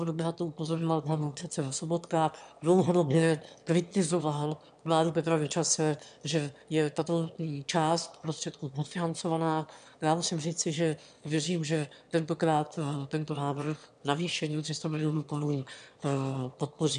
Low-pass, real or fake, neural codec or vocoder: 9.9 kHz; fake; autoencoder, 22.05 kHz, a latent of 192 numbers a frame, VITS, trained on one speaker